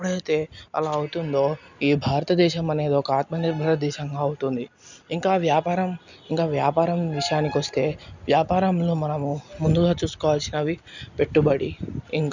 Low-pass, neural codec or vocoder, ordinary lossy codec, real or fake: 7.2 kHz; none; none; real